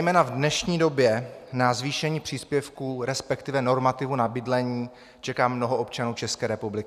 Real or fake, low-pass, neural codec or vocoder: real; 14.4 kHz; none